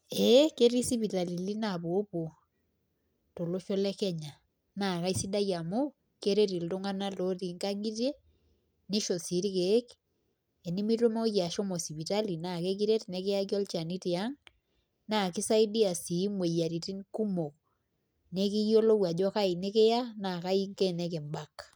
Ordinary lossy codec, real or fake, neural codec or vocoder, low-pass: none; real; none; none